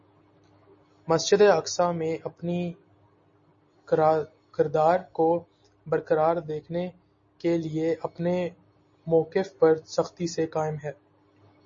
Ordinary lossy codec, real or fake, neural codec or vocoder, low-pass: MP3, 32 kbps; real; none; 7.2 kHz